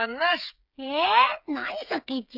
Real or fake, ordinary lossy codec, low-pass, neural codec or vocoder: fake; none; 5.4 kHz; codec, 16 kHz, 8 kbps, FreqCodec, smaller model